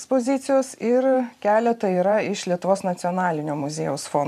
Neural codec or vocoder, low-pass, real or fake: vocoder, 44.1 kHz, 128 mel bands every 512 samples, BigVGAN v2; 14.4 kHz; fake